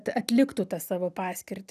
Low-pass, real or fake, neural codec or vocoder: 14.4 kHz; real; none